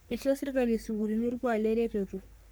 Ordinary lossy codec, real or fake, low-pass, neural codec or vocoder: none; fake; none; codec, 44.1 kHz, 3.4 kbps, Pupu-Codec